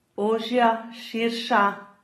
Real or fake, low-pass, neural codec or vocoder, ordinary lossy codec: real; 19.8 kHz; none; AAC, 32 kbps